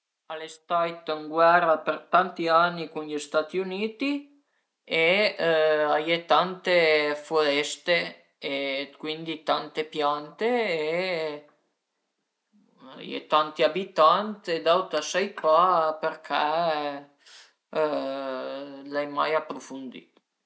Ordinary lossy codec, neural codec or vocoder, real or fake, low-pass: none; none; real; none